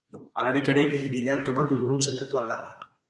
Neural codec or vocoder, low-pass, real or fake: codec, 24 kHz, 1 kbps, SNAC; 10.8 kHz; fake